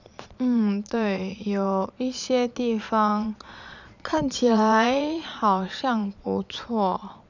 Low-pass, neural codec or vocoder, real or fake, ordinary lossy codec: 7.2 kHz; vocoder, 22.05 kHz, 80 mel bands, Vocos; fake; none